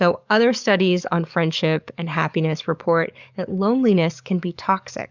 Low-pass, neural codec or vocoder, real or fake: 7.2 kHz; codec, 44.1 kHz, 7.8 kbps, DAC; fake